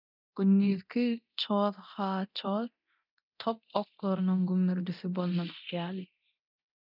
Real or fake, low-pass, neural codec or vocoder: fake; 5.4 kHz; codec, 24 kHz, 0.9 kbps, DualCodec